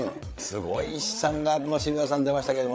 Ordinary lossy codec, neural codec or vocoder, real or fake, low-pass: none; codec, 16 kHz, 8 kbps, FreqCodec, larger model; fake; none